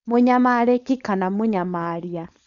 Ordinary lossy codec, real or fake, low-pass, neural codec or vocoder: none; fake; 7.2 kHz; codec, 16 kHz, 4.8 kbps, FACodec